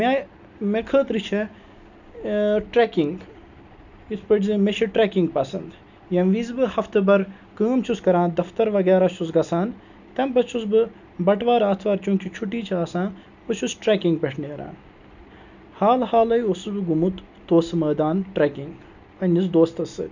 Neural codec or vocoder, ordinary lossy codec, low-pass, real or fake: none; none; 7.2 kHz; real